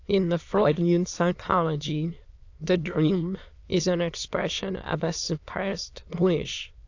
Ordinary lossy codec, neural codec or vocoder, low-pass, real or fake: AAC, 48 kbps; autoencoder, 22.05 kHz, a latent of 192 numbers a frame, VITS, trained on many speakers; 7.2 kHz; fake